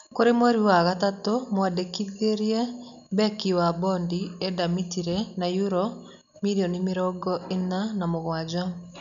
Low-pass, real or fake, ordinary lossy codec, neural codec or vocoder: 7.2 kHz; real; none; none